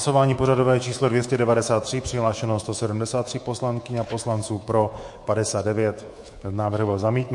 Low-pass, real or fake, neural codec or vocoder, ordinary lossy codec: 10.8 kHz; fake; codec, 24 kHz, 3.1 kbps, DualCodec; MP3, 48 kbps